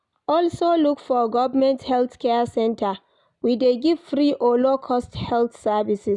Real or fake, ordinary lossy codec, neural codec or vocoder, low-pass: real; none; none; 10.8 kHz